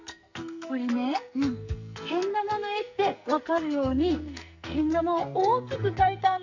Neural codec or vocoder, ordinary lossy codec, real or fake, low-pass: codec, 44.1 kHz, 2.6 kbps, SNAC; none; fake; 7.2 kHz